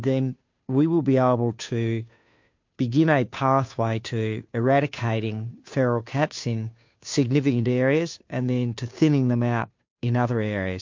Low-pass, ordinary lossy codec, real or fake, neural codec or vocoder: 7.2 kHz; MP3, 48 kbps; fake; codec, 16 kHz, 2 kbps, FunCodec, trained on Chinese and English, 25 frames a second